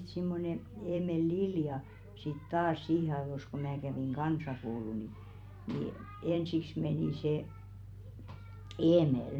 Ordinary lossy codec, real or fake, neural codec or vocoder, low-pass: none; fake; vocoder, 44.1 kHz, 128 mel bands every 256 samples, BigVGAN v2; 19.8 kHz